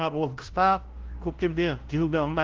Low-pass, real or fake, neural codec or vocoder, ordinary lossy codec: 7.2 kHz; fake; codec, 16 kHz, 0.5 kbps, FunCodec, trained on LibriTTS, 25 frames a second; Opus, 16 kbps